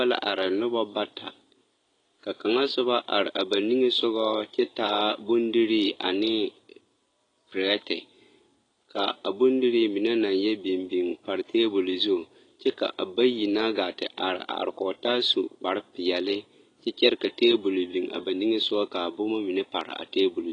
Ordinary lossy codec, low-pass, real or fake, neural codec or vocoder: AAC, 32 kbps; 9.9 kHz; real; none